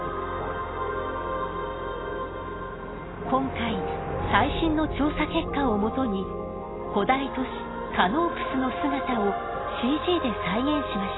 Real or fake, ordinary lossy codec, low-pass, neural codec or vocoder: real; AAC, 16 kbps; 7.2 kHz; none